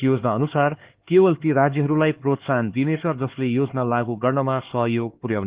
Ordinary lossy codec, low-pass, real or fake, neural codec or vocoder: Opus, 16 kbps; 3.6 kHz; fake; codec, 16 kHz, 4 kbps, X-Codec, HuBERT features, trained on LibriSpeech